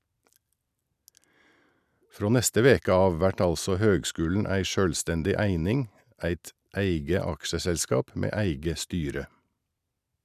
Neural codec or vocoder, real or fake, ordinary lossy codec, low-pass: none; real; none; 14.4 kHz